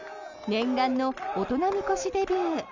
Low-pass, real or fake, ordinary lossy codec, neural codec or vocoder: 7.2 kHz; real; none; none